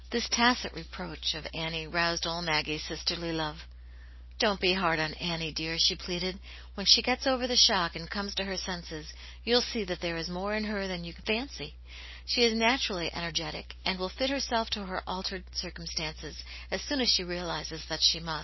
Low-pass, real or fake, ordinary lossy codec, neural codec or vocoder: 7.2 kHz; real; MP3, 24 kbps; none